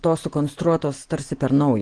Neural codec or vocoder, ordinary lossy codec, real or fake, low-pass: none; Opus, 16 kbps; real; 9.9 kHz